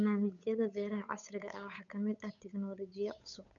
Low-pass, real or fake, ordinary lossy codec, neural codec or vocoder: 7.2 kHz; fake; none; codec, 16 kHz, 8 kbps, FunCodec, trained on Chinese and English, 25 frames a second